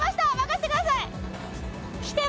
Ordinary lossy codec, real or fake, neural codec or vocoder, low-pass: none; real; none; none